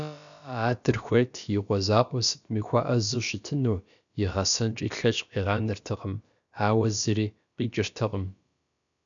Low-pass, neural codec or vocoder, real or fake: 7.2 kHz; codec, 16 kHz, about 1 kbps, DyCAST, with the encoder's durations; fake